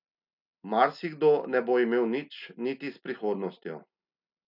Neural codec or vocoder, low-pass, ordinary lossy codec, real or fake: none; 5.4 kHz; none; real